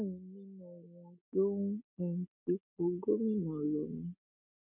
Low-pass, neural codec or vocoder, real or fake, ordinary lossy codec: 3.6 kHz; none; real; none